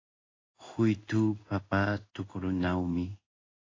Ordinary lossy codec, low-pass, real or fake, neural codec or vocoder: AAC, 32 kbps; 7.2 kHz; fake; codec, 16 kHz in and 24 kHz out, 1 kbps, XY-Tokenizer